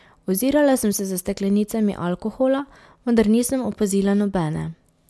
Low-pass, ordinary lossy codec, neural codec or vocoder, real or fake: none; none; none; real